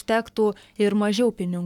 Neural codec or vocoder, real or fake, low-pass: codec, 44.1 kHz, 7.8 kbps, Pupu-Codec; fake; 19.8 kHz